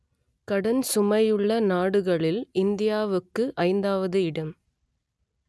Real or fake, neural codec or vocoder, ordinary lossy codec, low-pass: real; none; none; none